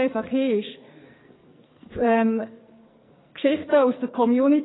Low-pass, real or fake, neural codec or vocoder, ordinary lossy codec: 7.2 kHz; fake; codec, 44.1 kHz, 2.6 kbps, SNAC; AAC, 16 kbps